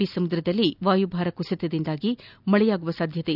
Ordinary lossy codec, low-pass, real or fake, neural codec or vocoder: none; 5.4 kHz; real; none